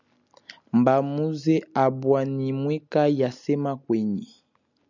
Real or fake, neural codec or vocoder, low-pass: real; none; 7.2 kHz